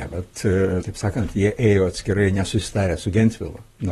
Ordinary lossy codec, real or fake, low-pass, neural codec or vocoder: AAC, 32 kbps; fake; 19.8 kHz; vocoder, 44.1 kHz, 128 mel bands, Pupu-Vocoder